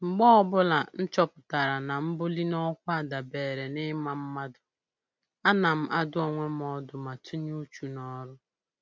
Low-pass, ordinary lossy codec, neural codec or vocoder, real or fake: none; none; none; real